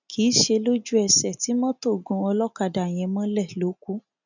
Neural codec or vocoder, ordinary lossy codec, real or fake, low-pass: none; none; real; 7.2 kHz